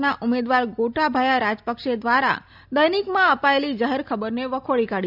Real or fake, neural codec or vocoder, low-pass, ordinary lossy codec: real; none; 5.4 kHz; none